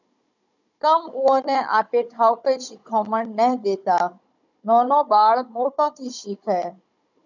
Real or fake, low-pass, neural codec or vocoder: fake; 7.2 kHz; codec, 16 kHz, 16 kbps, FunCodec, trained on Chinese and English, 50 frames a second